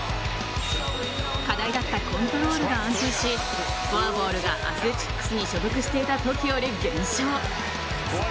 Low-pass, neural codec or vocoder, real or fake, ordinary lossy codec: none; none; real; none